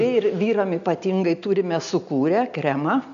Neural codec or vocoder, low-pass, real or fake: none; 7.2 kHz; real